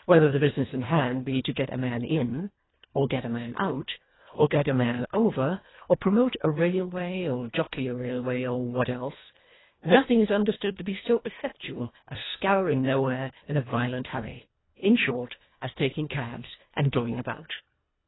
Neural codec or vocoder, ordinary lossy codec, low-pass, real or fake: codec, 24 kHz, 1.5 kbps, HILCodec; AAC, 16 kbps; 7.2 kHz; fake